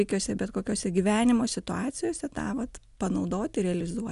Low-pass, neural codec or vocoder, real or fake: 10.8 kHz; none; real